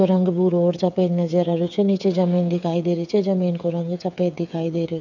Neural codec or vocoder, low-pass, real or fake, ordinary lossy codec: codec, 16 kHz, 8 kbps, FreqCodec, smaller model; 7.2 kHz; fake; none